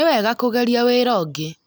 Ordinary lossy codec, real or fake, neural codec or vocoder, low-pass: none; real; none; none